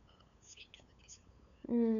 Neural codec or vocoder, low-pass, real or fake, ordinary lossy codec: codec, 16 kHz, 8 kbps, FunCodec, trained on LibriTTS, 25 frames a second; 7.2 kHz; fake; none